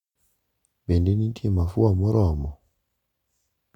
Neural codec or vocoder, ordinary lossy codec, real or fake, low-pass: none; none; real; 19.8 kHz